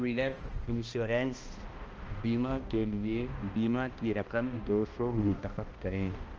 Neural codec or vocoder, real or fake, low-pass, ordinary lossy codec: codec, 16 kHz, 1 kbps, X-Codec, HuBERT features, trained on balanced general audio; fake; 7.2 kHz; Opus, 24 kbps